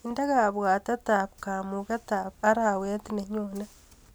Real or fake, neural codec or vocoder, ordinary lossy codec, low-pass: real; none; none; none